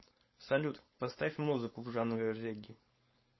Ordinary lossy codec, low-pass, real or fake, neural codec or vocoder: MP3, 24 kbps; 7.2 kHz; fake; codec, 16 kHz, 4.8 kbps, FACodec